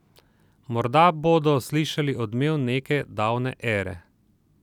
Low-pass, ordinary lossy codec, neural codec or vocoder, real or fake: 19.8 kHz; none; none; real